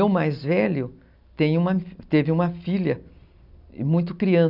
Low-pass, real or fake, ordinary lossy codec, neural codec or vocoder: 5.4 kHz; real; none; none